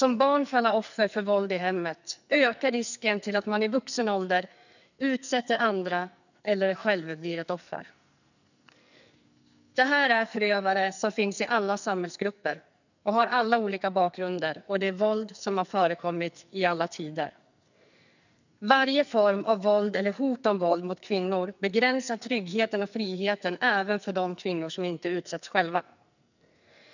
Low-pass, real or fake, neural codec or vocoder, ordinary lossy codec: 7.2 kHz; fake; codec, 44.1 kHz, 2.6 kbps, SNAC; none